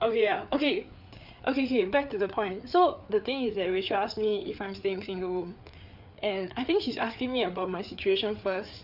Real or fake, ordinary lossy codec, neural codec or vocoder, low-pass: fake; none; codec, 16 kHz, 4 kbps, FreqCodec, larger model; 5.4 kHz